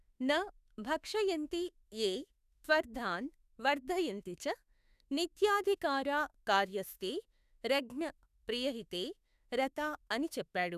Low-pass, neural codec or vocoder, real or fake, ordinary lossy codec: 14.4 kHz; autoencoder, 48 kHz, 32 numbers a frame, DAC-VAE, trained on Japanese speech; fake; none